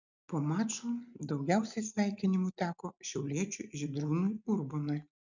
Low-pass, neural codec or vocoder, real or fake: 7.2 kHz; none; real